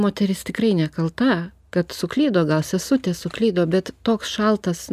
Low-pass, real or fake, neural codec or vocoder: 14.4 kHz; real; none